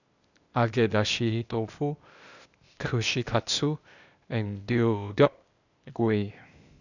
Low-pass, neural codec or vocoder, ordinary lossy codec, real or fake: 7.2 kHz; codec, 16 kHz, 0.8 kbps, ZipCodec; none; fake